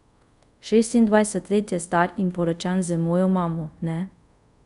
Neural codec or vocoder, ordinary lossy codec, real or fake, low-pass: codec, 24 kHz, 0.5 kbps, DualCodec; none; fake; 10.8 kHz